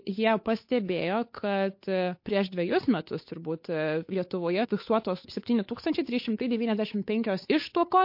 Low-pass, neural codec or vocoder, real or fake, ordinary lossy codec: 5.4 kHz; codec, 16 kHz, 8 kbps, FunCodec, trained on Chinese and English, 25 frames a second; fake; MP3, 32 kbps